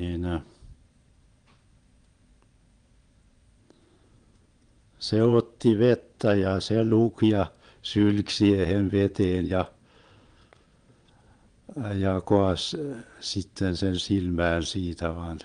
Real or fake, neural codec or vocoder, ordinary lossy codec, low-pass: fake; vocoder, 22.05 kHz, 80 mel bands, Vocos; Opus, 32 kbps; 9.9 kHz